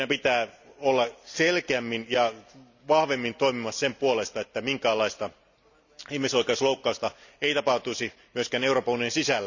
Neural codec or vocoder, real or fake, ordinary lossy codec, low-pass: none; real; none; 7.2 kHz